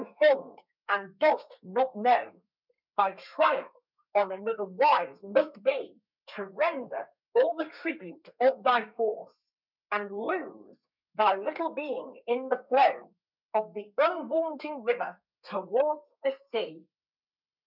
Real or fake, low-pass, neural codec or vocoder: fake; 5.4 kHz; codec, 32 kHz, 1.9 kbps, SNAC